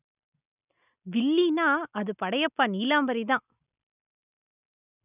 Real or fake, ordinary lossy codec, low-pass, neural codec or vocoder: real; none; 3.6 kHz; none